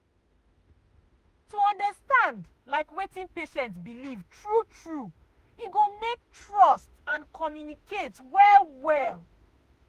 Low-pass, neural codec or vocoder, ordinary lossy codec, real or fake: 14.4 kHz; autoencoder, 48 kHz, 32 numbers a frame, DAC-VAE, trained on Japanese speech; Opus, 32 kbps; fake